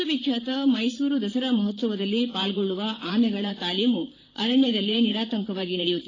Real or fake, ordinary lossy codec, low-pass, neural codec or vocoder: fake; AAC, 32 kbps; 7.2 kHz; vocoder, 44.1 kHz, 128 mel bands, Pupu-Vocoder